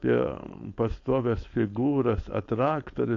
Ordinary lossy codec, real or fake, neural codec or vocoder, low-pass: AAC, 48 kbps; fake; codec, 16 kHz, 4.8 kbps, FACodec; 7.2 kHz